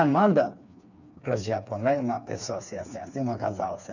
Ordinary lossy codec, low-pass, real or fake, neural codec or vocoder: none; 7.2 kHz; fake; codec, 16 kHz, 4 kbps, FreqCodec, smaller model